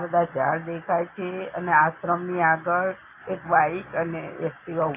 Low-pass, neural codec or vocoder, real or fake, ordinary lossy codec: 3.6 kHz; none; real; AAC, 24 kbps